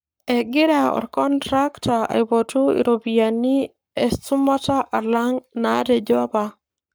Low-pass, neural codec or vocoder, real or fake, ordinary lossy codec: none; codec, 44.1 kHz, 7.8 kbps, Pupu-Codec; fake; none